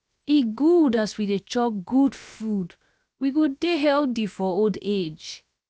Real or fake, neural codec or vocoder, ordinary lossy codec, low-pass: fake; codec, 16 kHz, 0.7 kbps, FocalCodec; none; none